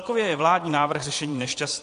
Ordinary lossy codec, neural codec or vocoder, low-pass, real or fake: AAC, 48 kbps; vocoder, 22.05 kHz, 80 mel bands, Vocos; 9.9 kHz; fake